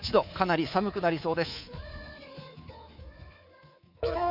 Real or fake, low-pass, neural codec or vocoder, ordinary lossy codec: fake; 5.4 kHz; codec, 24 kHz, 3.1 kbps, DualCodec; Opus, 64 kbps